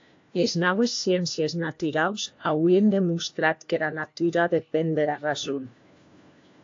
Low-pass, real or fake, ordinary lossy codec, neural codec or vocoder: 7.2 kHz; fake; AAC, 48 kbps; codec, 16 kHz, 1 kbps, FunCodec, trained on LibriTTS, 50 frames a second